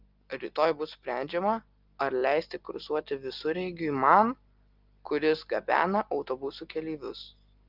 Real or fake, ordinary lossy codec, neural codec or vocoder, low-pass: real; Opus, 24 kbps; none; 5.4 kHz